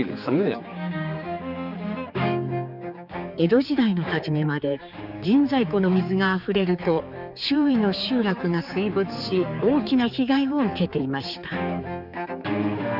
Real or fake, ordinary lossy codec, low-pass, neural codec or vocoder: fake; none; 5.4 kHz; codec, 16 kHz, 4 kbps, X-Codec, HuBERT features, trained on general audio